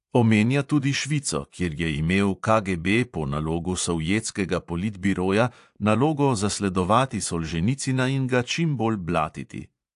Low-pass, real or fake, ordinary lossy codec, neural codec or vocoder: 10.8 kHz; fake; AAC, 64 kbps; vocoder, 24 kHz, 100 mel bands, Vocos